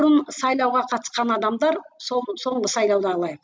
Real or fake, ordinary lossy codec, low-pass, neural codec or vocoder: real; none; none; none